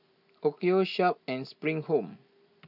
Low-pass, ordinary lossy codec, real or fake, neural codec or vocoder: 5.4 kHz; none; real; none